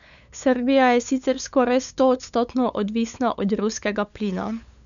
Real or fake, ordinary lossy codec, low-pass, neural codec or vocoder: fake; none; 7.2 kHz; codec, 16 kHz, 8 kbps, FunCodec, trained on LibriTTS, 25 frames a second